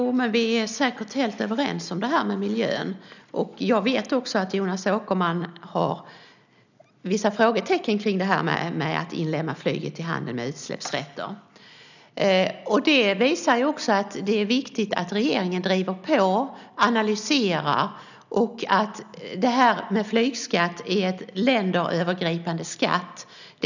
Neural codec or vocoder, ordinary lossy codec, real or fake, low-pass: none; none; real; 7.2 kHz